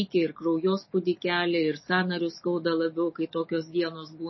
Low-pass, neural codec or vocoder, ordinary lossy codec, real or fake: 7.2 kHz; none; MP3, 24 kbps; real